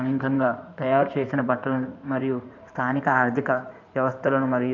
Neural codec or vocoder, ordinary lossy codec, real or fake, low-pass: codec, 16 kHz in and 24 kHz out, 2.2 kbps, FireRedTTS-2 codec; none; fake; 7.2 kHz